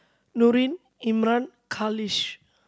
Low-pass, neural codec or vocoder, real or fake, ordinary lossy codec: none; none; real; none